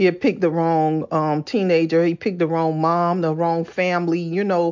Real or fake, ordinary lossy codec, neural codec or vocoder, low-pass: real; MP3, 64 kbps; none; 7.2 kHz